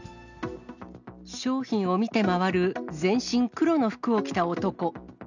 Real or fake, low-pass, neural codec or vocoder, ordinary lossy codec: real; 7.2 kHz; none; none